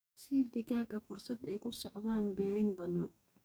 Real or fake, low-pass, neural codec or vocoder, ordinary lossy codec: fake; none; codec, 44.1 kHz, 2.6 kbps, DAC; none